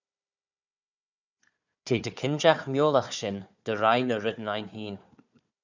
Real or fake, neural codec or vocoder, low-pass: fake; codec, 16 kHz, 4 kbps, FunCodec, trained on Chinese and English, 50 frames a second; 7.2 kHz